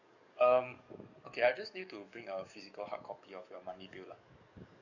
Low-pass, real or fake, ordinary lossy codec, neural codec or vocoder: 7.2 kHz; fake; none; codec, 44.1 kHz, 7.8 kbps, DAC